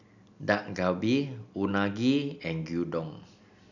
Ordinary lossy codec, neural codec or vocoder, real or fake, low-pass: none; none; real; 7.2 kHz